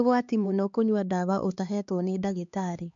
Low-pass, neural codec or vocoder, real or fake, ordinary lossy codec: 7.2 kHz; codec, 16 kHz, 2 kbps, X-Codec, HuBERT features, trained on LibriSpeech; fake; MP3, 64 kbps